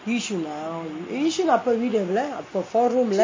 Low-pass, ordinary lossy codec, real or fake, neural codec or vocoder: 7.2 kHz; MP3, 32 kbps; real; none